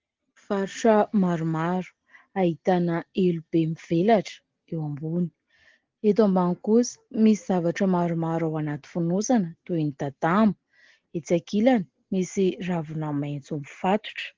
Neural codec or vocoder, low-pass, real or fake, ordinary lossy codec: none; 7.2 kHz; real; Opus, 16 kbps